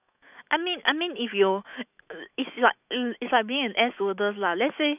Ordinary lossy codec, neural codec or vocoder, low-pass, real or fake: none; autoencoder, 48 kHz, 128 numbers a frame, DAC-VAE, trained on Japanese speech; 3.6 kHz; fake